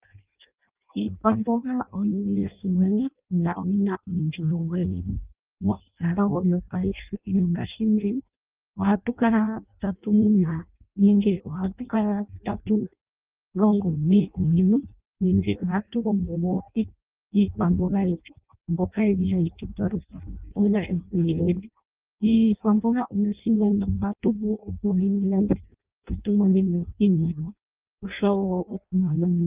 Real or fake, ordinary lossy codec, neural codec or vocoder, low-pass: fake; Opus, 32 kbps; codec, 16 kHz in and 24 kHz out, 0.6 kbps, FireRedTTS-2 codec; 3.6 kHz